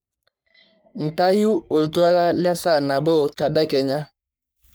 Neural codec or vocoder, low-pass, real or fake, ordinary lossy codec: codec, 44.1 kHz, 3.4 kbps, Pupu-Codec; none; fake; none